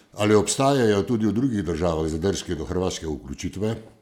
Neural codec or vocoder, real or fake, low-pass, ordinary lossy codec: none; real; 19.8 kHz; none